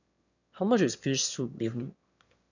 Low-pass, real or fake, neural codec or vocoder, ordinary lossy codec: 7.2 kHz; fake; autoencoder, 22.05 kHz, a latent of 192 numbers a frame, VITS, trained on one speaker; none